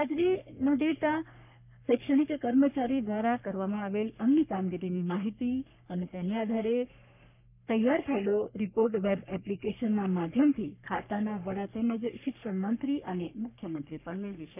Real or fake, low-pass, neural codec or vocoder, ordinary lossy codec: fake; 3.6 kHz; codec, 44.1 kHz, 3.4 kbps, Pupu-Codec; none